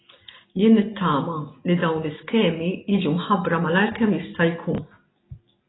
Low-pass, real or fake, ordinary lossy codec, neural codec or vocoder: 7.2 kHz; real; AAC, 16 kbps; none